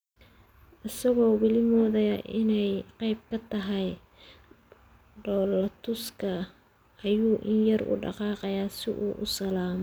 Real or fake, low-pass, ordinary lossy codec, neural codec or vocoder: real; none; none; none